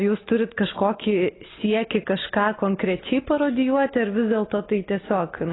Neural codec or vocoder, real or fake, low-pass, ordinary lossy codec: none; real; 7.2 kHz; AAC, 16 kbps